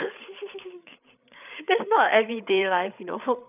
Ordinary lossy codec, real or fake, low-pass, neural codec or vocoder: none; fake; 3.6 kHz; codec, 16 kHz, 8 kbps, FreqCodec, larger model